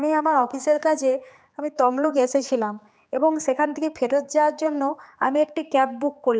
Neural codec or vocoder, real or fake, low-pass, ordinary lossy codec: codec, 16 kHz, 4 kbps, X-Codec, HuBERT features, trained on general audio; fake; none; none